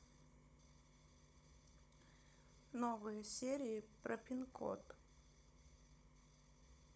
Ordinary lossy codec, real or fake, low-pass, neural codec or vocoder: none; fake; none; codec, 16 kHz, 16 kbps, FunCodec, trained on Chinese and English, 50 frames a second